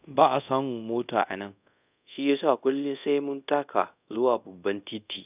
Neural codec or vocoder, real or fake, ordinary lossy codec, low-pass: codec, 24 kHz, 0.5 kbps, DualCodec; fake; none; 3.6 kHz